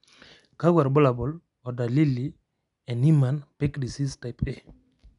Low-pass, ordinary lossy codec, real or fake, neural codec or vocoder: 10.8 kHz; none; real; none